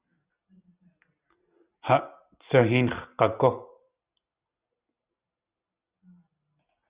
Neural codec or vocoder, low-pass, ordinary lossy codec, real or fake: none; 3.6 kHz; Opus, 64 kbps; real